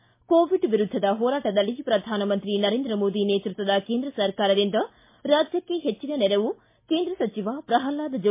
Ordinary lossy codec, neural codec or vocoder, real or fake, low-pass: MP3, 24 kbps; none; real; 3.6 kHz